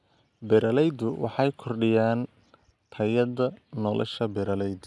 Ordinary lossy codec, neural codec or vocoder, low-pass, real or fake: none; none; none; real